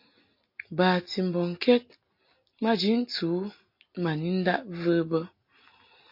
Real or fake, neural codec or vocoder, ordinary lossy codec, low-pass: real; none; MP3, 32 kbps; 5.4 kHz